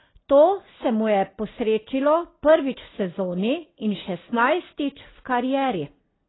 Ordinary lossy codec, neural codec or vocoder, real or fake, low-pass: AAC, 16 kbps; none; real; 7.2 kHz